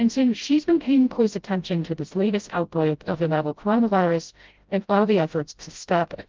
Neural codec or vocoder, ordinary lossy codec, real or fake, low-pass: codec, 16 kHz, 0.5 kbps, FreqCodec, smaller model; Opus, 32 kbps; fake; 7.2 kHz